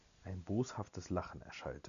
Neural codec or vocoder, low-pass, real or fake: none; 7.2 kHz; real